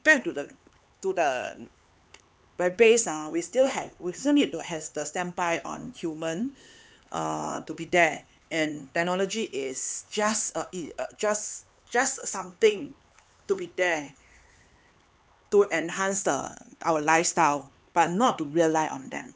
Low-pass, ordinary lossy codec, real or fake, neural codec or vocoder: none; none; fake; codec, 16 kHz, 4 kbps, X-Codec, HuBERT features, trained on LibriSpeech